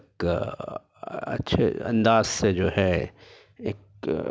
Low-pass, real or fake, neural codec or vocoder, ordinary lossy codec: none; real; none; none